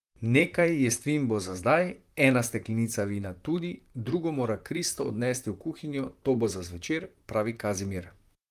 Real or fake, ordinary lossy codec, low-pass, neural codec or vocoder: fake; Opus, 32 kbps; 14.4 kHz; vocoder, 44.1 kHz, 128 mel bands, Pupu-Vocoder